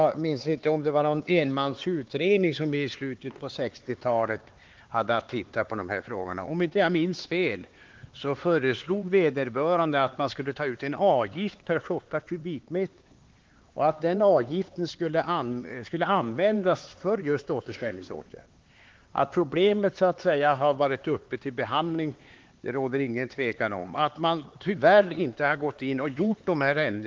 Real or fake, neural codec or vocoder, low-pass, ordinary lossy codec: fake; codec, 16 kHz, 4 kbps, X-Codec, HuBERT features, trained on LibriSpeech; 7.2 kHz; Opus, 16 kbps